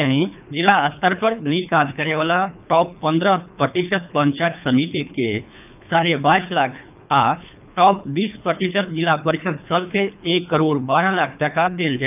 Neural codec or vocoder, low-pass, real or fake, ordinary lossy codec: codec, 24 kHz, 3 kbps, HILCodec; 3.6 kHz; fake; none